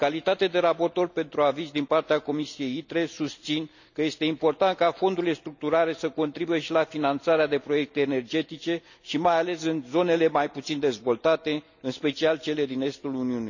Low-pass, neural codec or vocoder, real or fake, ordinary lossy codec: 7.2 kHz; none; real; none